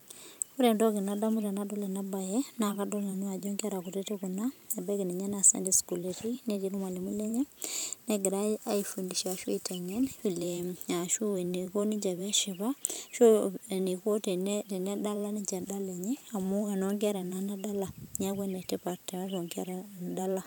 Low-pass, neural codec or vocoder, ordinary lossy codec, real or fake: none; vocoder, 44.1 kHz, 128 mel bands every 512 samples, BigVGAN v2; none; fake